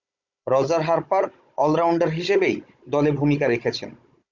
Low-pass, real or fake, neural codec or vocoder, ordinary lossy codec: 7.2 kHz; fake; codec, 16 kHz, 16 kbps, FunCodec, trained on Chinese and English, 50 frames a second; Opus, 64 kbps